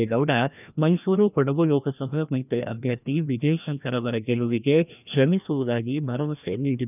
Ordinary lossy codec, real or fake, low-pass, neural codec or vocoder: none; fake; 3.6 kHz; codec, 16 kHz, 1 kbps, FreqCodec, larger model